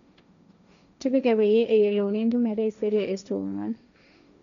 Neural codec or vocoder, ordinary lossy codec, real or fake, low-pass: codec, 16 kHz, 1.1 kbps, Voila-Tokenizer; none; fake; 7.2 kHz